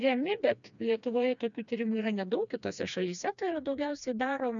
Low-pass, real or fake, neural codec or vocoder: 7.2 kHz; fake; codec, 16 kHz, 2 kbps, FreqCodec, smaller model